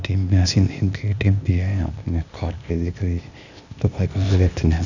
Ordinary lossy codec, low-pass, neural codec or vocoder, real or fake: none; 7.2 kHz; codec, 16 kHz, 0.8 kbps, ZipCodec; fake